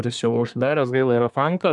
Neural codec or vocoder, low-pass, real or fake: codec, 24 kHz, 1 kbps, SNAC; 10.8 kHz; fake